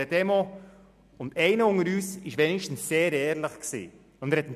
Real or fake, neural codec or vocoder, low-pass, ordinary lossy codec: real; none; 14.4 kHz; none